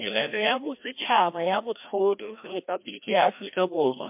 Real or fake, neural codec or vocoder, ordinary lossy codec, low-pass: fake; codec, 16 kHz, 1 kbps, FreqCodec, larger model; MP3, 32 kbps; 3.6 kHz